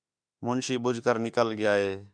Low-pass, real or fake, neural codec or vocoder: 9.9 kHz; fake; autoencoder, 48 kHz, 32 numbers a frame, DAC-VAE, trained on Japanese speech